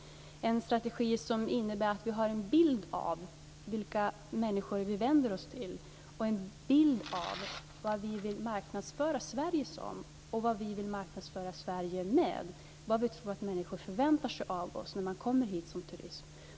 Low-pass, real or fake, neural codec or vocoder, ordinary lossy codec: none; real; none; none